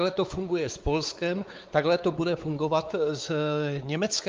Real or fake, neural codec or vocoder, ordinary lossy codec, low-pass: fake; codec, 16 kHz, 4 kbps, X-Codec, WavLM features, trained on Multilingual LibriSpeech; Opus, 32 kbps; 7.2 kHz